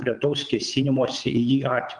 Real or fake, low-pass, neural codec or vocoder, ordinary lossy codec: fake; 9.9 kHz; vocoder, 22.05 kHz, 80 mel bands, WaveNeXt; Opus, 24 kbps